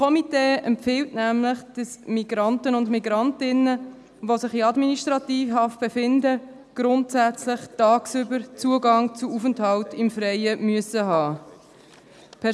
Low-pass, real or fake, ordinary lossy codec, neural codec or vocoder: none; real; none; none